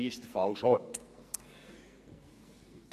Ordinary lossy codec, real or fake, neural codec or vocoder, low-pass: MP3, 64 kbps; fake; codec, 44.1 kHz, 2.6 kbps, SNAC; 14.4 kHz